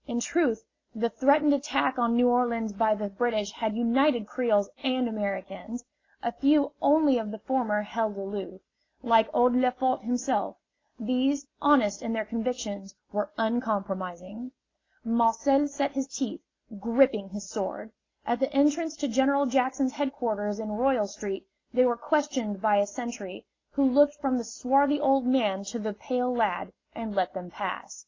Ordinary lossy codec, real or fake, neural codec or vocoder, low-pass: AAC, 32 kbps; real; none; 7.2 kHz